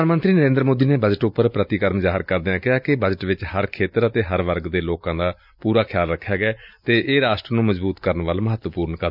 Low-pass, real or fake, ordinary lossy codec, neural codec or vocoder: 5.4 kHz; real; none; none